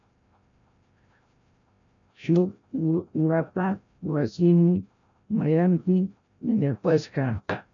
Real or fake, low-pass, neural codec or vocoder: fake; 7.2 kHz; codec, 16 kHz, 0.5 kbps, FreqCodec, larger model